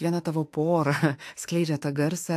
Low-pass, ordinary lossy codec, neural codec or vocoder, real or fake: 14.4 kHz; AAC, 64 kbps; autoencoder, 48 kHz, 32 numbers a frame, DAC-VAE, trained on Japanese speech; fake